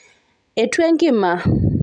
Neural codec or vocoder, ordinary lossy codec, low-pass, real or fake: none; none; 10.8 kHz; real